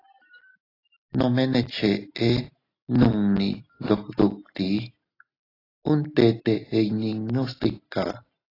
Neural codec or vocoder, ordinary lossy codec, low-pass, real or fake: none; AAC, 24 kbps; 5.4 kHz; real